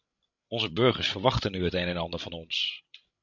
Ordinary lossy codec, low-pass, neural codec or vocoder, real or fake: MP3, 64 kbps; 7.2 kHz; codec, 16 kHz, 16 kbps, FreqCodec, larger model; fake